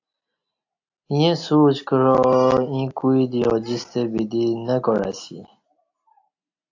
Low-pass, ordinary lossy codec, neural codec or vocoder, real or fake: 7.2 kHz; AAC, 48 kbps; none; real